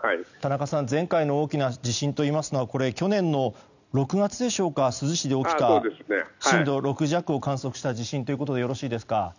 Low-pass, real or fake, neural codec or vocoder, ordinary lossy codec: 7.2 kHz; real; none; none